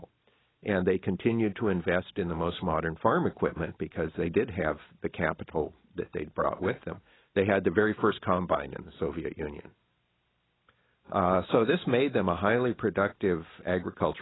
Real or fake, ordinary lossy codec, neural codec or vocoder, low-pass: real; AAC, 16 kbps; none; 7.2 kHz